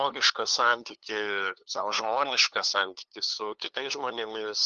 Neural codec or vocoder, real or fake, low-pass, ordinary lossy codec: codec, 16 kHz, 2 kbps, FunCodec, trained on LibriTTS, 25 frames a second; fake; 7.2 kHz; Opus, 24 kbps